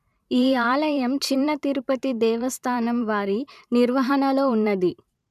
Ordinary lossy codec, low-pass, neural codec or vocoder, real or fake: none; 14.4 kHz; vocoder, 44.1 kHz, 128 mel bands every 512 samples, BigVGAN v2; fake